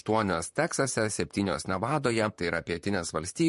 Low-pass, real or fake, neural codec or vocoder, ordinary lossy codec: 14.4 kHz; fake; vocoder, 44.1 kHz, 128 mel bands, Pupu-Vocoder; MP3, 48 kbps